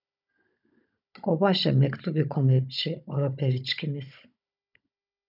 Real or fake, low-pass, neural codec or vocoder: fake; 5.4 kHz; codec, 16 kHz, 16 kbps, FunCodec, trained on Chinese and English, 50 frames a second